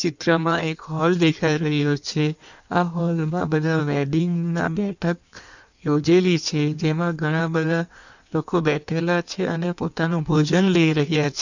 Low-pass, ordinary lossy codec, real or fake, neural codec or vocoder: 7.2 kHz; none; fake; codec, 16 kHz in and 24 kHz out, 1.1 kbps, FireRedTTS-2 codec